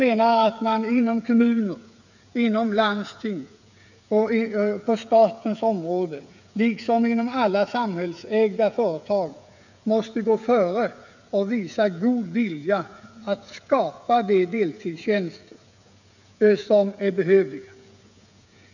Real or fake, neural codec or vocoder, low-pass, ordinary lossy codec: fake; codec, 16 kHz, 8 kbps, FreqCodec, smaller model; 7.2 kHz; none